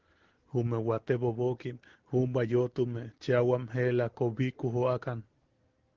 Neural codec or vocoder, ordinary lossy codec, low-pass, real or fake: none; Opus, 16 kbps; 7.2 kHz; real